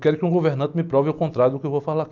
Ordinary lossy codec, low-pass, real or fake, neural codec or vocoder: none; 7.2 kHz; real; none